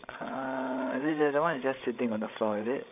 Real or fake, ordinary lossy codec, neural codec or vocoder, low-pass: fake; none; codec, 16 kHz, 16 kbps, FreqCodec, larger model; 3.6 kHz